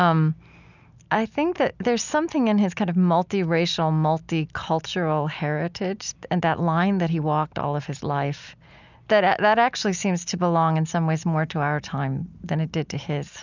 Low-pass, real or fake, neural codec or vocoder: 7.2 kHz; real; none